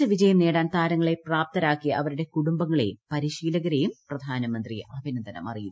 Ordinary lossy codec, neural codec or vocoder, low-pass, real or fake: none; none; none; real